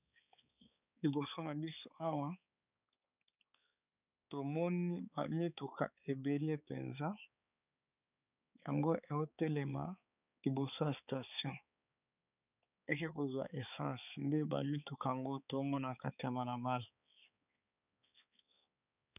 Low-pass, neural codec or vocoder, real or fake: 3.6 kHz; codec, 16 kHz, 4 kbps, X-Codec, HuBERT features, trained on balanced general audio; fake